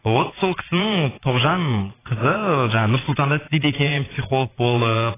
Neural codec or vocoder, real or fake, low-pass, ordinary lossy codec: vocoder, 22.05 kHz, 80 mel bands, Vocos; fake; 3.6 kHz; AAC, 16 kbps